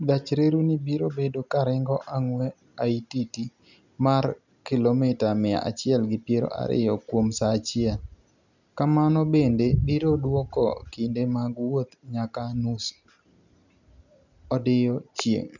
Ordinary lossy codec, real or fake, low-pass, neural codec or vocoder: none; real; 7.2 kHz; none